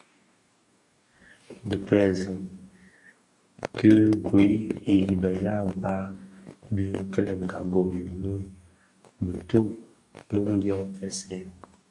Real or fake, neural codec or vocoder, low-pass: fake; codec, 44.1 kHz, 2.6 kbps, DAC; 10.8 kHz